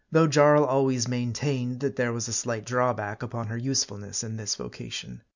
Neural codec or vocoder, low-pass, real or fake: none; 7.2 kHz; real